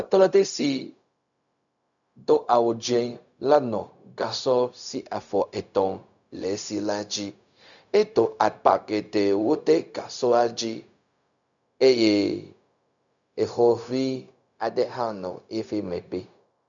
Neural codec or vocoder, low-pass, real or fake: codec, 16 kHz, 0.4 kbps, LongCat-Audio-Codec; 7.2 kHz; fake